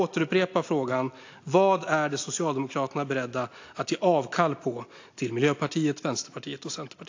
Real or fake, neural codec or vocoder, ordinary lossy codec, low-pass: real; none; AAC, 48 kbps; 7.2 kHz